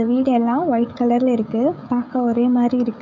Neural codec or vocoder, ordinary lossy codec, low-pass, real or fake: codec, 16 kHz, 16 kbps, FreqCodec, smaller model; none; 7.2 kHz; fake